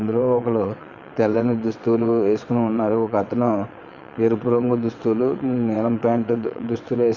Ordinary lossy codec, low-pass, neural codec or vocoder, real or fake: Opus, 64 kbps; 7.2 kHz; vocoder, 22.05 kHz, 80 mel bands, WaveNeXt; fake